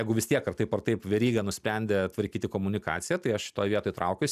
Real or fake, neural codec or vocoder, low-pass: real; none; 14.4 kHz